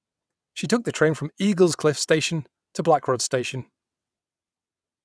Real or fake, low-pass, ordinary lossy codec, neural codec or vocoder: fake; none; none; vocoder, 22.05 kHz, 80 mel bands, WaveNeXt